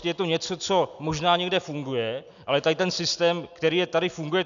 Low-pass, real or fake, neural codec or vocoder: 7.2 kHz; real; none